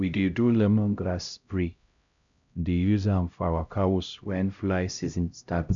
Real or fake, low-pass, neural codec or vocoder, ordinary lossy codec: fake; 7.2 kHz; codec, 16 kHz, 0.5 kbps, X-Codec, HuBERT features, trained on LibriSpeech; none